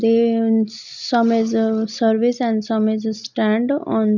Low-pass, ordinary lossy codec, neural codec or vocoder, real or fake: 7.2 kHz; none; none; real